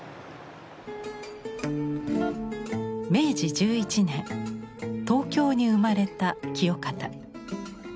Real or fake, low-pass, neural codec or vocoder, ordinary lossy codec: real; none; none; none